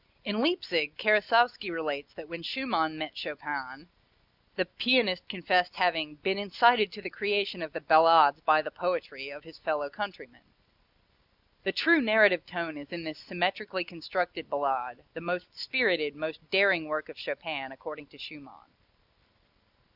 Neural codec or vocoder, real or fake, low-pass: none; real; 5.4 kHz